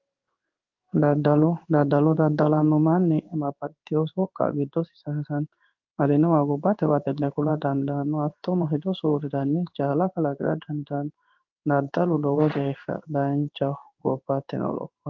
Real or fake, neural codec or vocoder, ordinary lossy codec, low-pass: fake; codec, 16 kHz in and 24 kHz out, 1 kbps, XY-Tokenizer; Opus, 32 kbps; 7.2 kHz